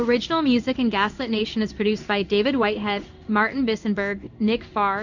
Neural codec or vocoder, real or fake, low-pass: codec, 16 kHz in and 24 kHz out, 1 kbps, XY-Tokenizer; fake; 7.2 kHz